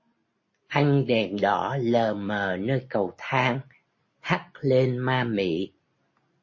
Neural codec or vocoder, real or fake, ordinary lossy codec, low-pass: none; real; MP3, 32 kbps; 7.2 kHz